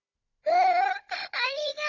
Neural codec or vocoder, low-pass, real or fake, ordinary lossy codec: codec, 16 kHz, 16 kbps, FunCodec, trained on Chinese and English, 50 frames a second; 7.2 kHz; fake; none